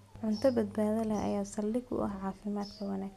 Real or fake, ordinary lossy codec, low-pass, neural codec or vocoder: real; none; 14.4 kHz; none